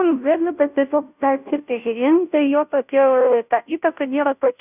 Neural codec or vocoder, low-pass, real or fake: codec, 16 kHz, 0.5 kbps, FunCodec, trained on Chinese and English, 25 frames a second; 3.6 kHz; fake